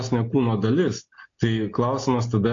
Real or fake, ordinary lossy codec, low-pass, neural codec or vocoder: real; AAC, 48 kbps; 7.2 kHz; none